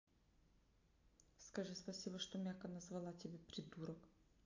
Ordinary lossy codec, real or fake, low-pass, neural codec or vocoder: AAC, 48 kbps; real; 7.2 kHz; none